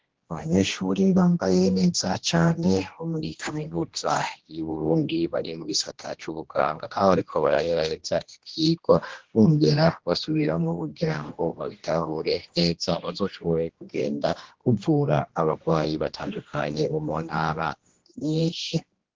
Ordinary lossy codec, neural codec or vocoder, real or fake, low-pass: Opus, 16 kbps; codec, 16 kHz, 1 kbps, X-Codec, HuBERT features, trained on general audio; fake; 7.2 kHz